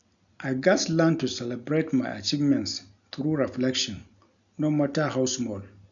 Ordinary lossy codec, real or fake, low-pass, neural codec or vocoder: none; real; 7.2 kHz; none